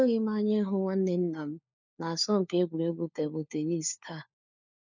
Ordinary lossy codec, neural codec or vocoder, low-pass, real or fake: none; codec, 16 kHz, 4 kbps, FunCodec, trained on LibriTTS, 50 frames a second; 7.2 kHz; fake